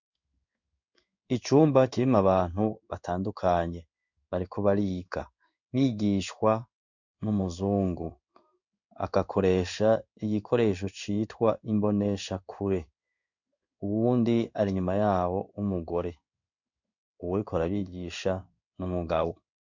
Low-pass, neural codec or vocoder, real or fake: 7.2 kHz; codec, 16 kHz in and 24 kHz out, 1 kbps, XY-Tokenizer; fake